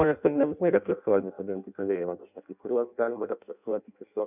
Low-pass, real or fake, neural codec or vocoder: 3.6 kHz; fake; codec, 16 kHz in and 24 kHz out, 0.6 kbps, FireRedTTS-2 codec